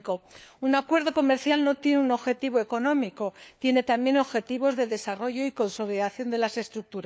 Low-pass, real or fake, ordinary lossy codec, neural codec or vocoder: none; fake; none; codec, 16 kHz, 4 kbps, FunCodec, trained on LibriTTS, 50 frames a second